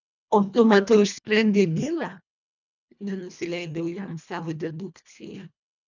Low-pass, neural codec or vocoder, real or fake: 7.2 kHz; codec, 24 kHz, 1.5 kbps, HILCodec; fake